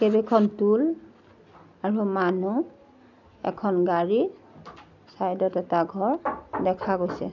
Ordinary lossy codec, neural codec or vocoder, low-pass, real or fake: none; none; 7.2 kHz; real